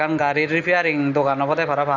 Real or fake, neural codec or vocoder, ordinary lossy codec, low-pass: real; none; none; 7.2 kHz